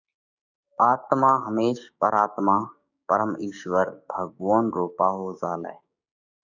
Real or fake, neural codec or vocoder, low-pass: fake; codec, 16 kHz, 6 kbps, DAC; 7.2 kHz